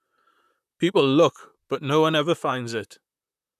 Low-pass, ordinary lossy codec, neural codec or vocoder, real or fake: 14.4 kHz; none; vocoder, 44.1 kHz, 128 mel bands, Pupu-Vocoder; fake